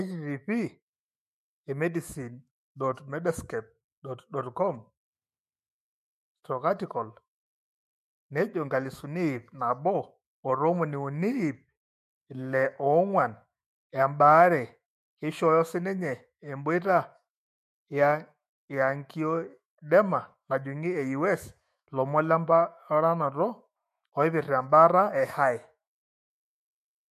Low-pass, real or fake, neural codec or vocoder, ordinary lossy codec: 14.4 kHz; fake; autoencoder, 48 kHz, 128 numbers a frame, DAC-VAE, trained on Japanese speech; MP3, 64 kbps